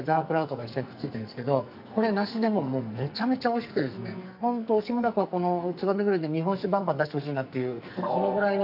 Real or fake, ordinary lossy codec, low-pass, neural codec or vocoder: fake; none; 5.4 kHz; codec, 44.1 kHz, 2.6 kbps, SNAC